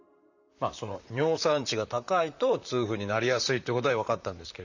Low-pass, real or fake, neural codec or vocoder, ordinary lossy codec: 7.2 kHz; fake; vocoder, 44.1 kHz, 128 mel bands, Pupu-Vocoder; none